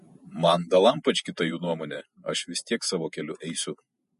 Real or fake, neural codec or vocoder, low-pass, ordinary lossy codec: fake; vocoder, 48 kHz, 128 mel bands, Vocos; 14.4 kHz; MP3, 48 kbps